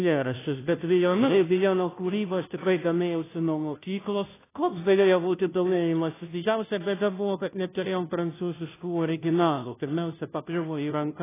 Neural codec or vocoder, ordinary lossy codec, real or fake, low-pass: codec, 16 kHz, 0.5 kbps, FunCodec, trained on Chinese and English, 25 frames a second; AAC, 16 kbps; fake; 3.6 kHz